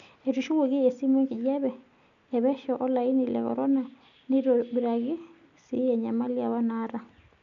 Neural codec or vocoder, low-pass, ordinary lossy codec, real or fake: none; 7.2 kHz; none; real